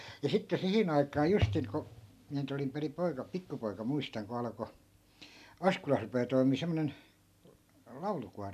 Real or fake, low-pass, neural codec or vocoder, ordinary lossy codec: real; 14.4 kHz; none; AAC, 96 kbps